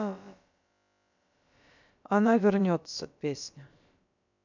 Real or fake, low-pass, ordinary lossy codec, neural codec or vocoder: fake; 7.2 kHz; none; codec, 16 kHz, about 1 kbps, DyCAST, with the encoder's durations